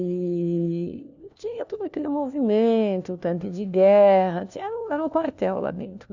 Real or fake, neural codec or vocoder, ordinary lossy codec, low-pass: fake; codec, 16 kHz, 1 kbps, FunCodec, trained on LibriTTS, 50 frames a second; none; 7.2 kHz